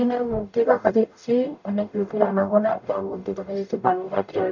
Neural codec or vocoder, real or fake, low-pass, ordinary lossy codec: codec, 44.1 kHz, 0.9 kbps, DAC; fake; 7.2 kHz; none